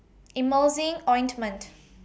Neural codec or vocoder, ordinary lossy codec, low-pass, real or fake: none; none; none; real